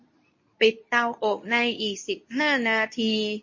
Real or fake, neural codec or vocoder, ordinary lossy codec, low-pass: fake; codec, 24 kHz, 0.9 kbps, WavTokenizer, medium speech release version 2; MP3, 32 kbps; 7.2 kHz